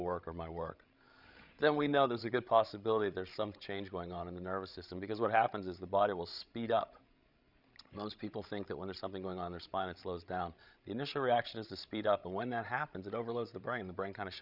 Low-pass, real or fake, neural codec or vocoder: 5.4 kHz; fake; codec, 16 kHz, 16 kbps, FreqCodec, larger model